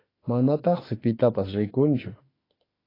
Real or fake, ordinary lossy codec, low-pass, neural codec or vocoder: fake; AAC, 24 kbps; 5.4 kHz; autoencoder, 48 kHz, 32 numbers a frame, DAC-VAE, trained on Japanese speech